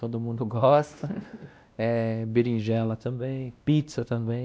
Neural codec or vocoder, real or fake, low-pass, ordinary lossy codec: codec, 16 kHz, 1 kbps, X-Codec, WavLM features, trained on Multilingual LibriSpeech; fake; none; none